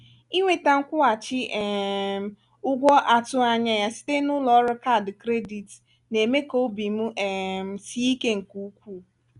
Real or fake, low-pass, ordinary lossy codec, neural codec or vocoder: real; 10.8 kHz; none; none